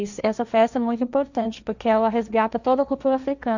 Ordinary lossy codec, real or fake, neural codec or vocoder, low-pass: none; fake; codec, 16 kHz, 1.1 kbps, Voila-Tokenizer; 7.2 kHz